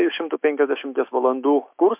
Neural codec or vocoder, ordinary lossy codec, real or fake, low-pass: autoencoder, 48 kHz, 128 numbers a frame, DAC-VAE, trained on Japanese speech; MP3, 32 kbps; fake; 3.6 kHz